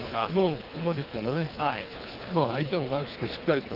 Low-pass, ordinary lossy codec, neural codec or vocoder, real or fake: 5.4 kHz; Opus, 32 kbps; codec, 24 kHz, 3 kbps, HILCodec; fake